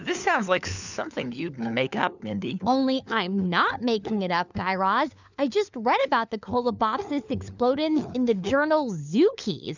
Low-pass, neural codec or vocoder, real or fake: 7.2 kHz; codec, 16 kHz, 4 kbps, FunCodec, trained on LibriTTS, 50 frames a second; fake